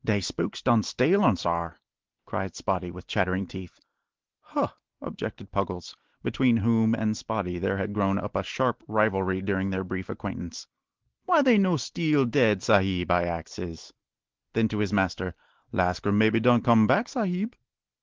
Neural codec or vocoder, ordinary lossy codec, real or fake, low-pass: none; Opus, 16 kbps; real; 7.2 kHz